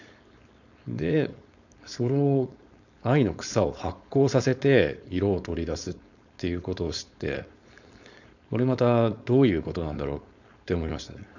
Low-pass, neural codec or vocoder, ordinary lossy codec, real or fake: 7.2 kHz; codec, 16 kHz, 4.8 kbps, FACodec; none; fake